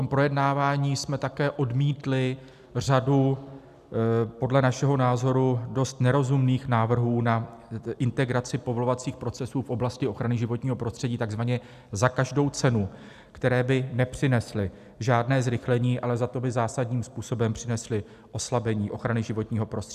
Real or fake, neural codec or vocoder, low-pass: real; none; 14.4 kHz